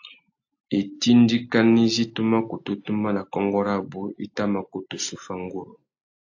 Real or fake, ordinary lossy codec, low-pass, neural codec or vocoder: real; AAC, 48 kbps; 7.2 kHz; none